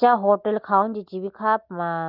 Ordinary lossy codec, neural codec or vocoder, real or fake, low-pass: Opus, 32 kbps; none; real; 5.4 kHz